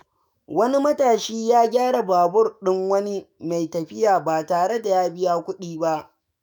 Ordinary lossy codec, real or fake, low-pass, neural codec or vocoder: none; fake; none; autoencoder, 48 kHz, 128 numbers a frame, DAC-VAE, trained on Japanese speech